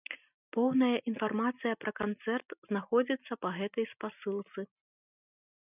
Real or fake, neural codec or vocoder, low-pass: real; none; 3.6 kHz